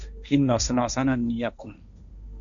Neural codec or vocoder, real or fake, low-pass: codec, 16 kHz, 1.1 kbps, Voila-Tokenizer; fake; 7.2 kHz